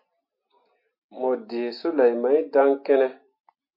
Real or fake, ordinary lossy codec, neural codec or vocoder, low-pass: real; MP3, 32 kbps; none; 5.4 kHz